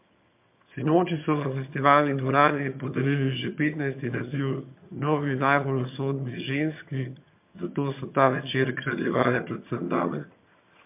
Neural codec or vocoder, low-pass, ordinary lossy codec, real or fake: vocoder, 22.05 kHz, 80 mel bands, HiFi-GAN; 3.6 kHz; MP3, 32 kbps; fake